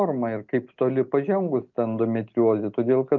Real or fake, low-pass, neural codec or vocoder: real; 7.2 kHz; none